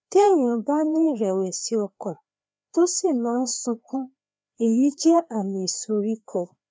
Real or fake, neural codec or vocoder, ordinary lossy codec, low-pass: fake; codec, 16 kHz, 2 kbps, FreqCodec, larger model; none; none